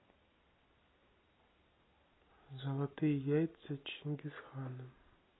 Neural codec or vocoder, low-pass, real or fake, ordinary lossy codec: none; 7.2 kHz; real; AAC, 16 kbps